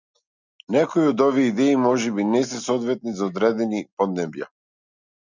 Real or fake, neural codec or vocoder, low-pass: real; none; 7.2 kHz